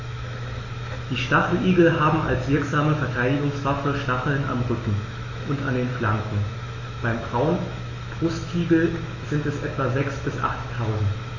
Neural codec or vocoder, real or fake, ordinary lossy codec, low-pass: autoencoder, 48 kHz, 128 numbers a frame, DAC-VAE, trained on Japanese speech; fake; AAC, 32 kbps; 7.2 kHz